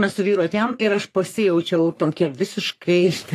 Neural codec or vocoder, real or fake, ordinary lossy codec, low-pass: codec, 44.1 kHz, 3.4 kbps, Pupu-Codec; fake; AAC, 64 kbps; 14.4 kHz